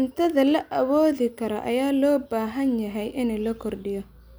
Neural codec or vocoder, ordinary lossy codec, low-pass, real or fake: none; none; none; real